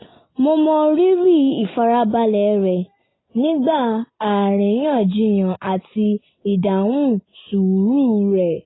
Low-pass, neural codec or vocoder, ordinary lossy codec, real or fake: 7.2 kHz; none; AAC, 16 kbps; real